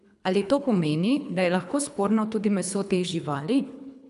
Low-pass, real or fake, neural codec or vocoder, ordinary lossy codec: 10.8 kHz; fake; codec, 24 kHz, 3 kbps, HILCodec; none